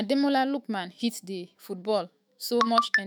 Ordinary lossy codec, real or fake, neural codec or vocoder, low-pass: none; fake; autoencoder, 48 kHz, 128 numbers a frame, DAC-VAE, trained on Japanese speech; none